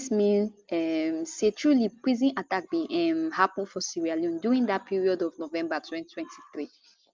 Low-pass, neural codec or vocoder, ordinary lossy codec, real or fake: 7.2 kHz; none; Opus, 32 kbps; real